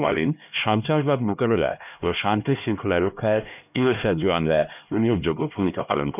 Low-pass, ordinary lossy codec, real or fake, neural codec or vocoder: 3.6 kHz; none; fake; codec, 16 kHz, 1 kbps, X-Codec, HuBERT features, trained on LibriSpeech